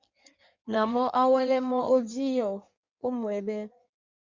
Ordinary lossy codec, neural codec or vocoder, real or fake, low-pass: Opus, 64 kbps; codec, 16 kHz in and 24 kHz out, 1.1 kbps, FireRedTTS-2 codec; fake; 7.2 kHz